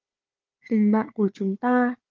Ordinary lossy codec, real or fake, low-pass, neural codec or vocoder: Opus, 32 kbps; fake; 7.2 kHz; codec, 16 kHz, 4 kbps, FunCodec, trained on Chinese and English, 50 frames a second